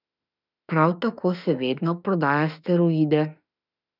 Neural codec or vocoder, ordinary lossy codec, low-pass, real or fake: autoencoder, 48 kHz, 32 numbers a frame, DAC-VAE, trained on Japanese speech; none; 5.4 kHz; fake